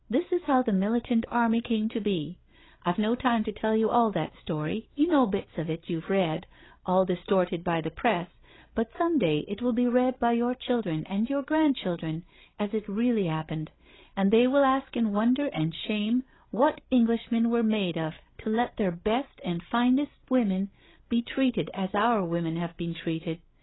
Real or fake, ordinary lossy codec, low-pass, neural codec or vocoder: fake; AAC, 16 kbps; 7.2 kHz; codec, 16 kHz, 8 kbps, FreqCodec, larger model